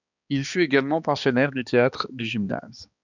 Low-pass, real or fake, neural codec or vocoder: 7.2 kHz; fake; codec, 16 kHz, 2 kbps, X-Codec, HuBERT features, trained on balanced general audio